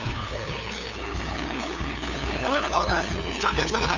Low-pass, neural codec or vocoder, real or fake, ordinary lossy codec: 7.2 kHz; codec, 16 kHz, 2 kbps, FunCodec, trained on LibriTTS, 25 frames a second; fake; none